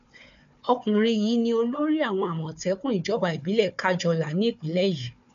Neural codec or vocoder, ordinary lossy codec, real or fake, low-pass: codec, 16 kHz, 4 kbps, FunCodec, trained on Chinese and English, 50 frames a second; none; fake; 7.2 kHz